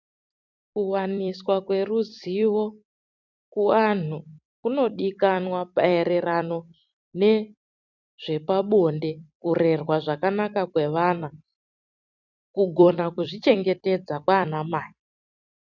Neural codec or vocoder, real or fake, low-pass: vocoder, 44.1 kHz, 128 mel bands every 256 samples, BigVGAN v2; fake; 7.2 kHz